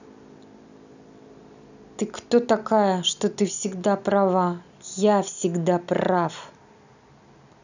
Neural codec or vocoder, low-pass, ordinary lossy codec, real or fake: none; 7.2 kHz; none; real